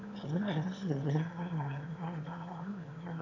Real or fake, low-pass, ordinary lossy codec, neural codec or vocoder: fake; 7.2 kHz; none; autoencoder, 22.05 kHz, a latent of 192 numbers a frame, VITS, trained on one speaker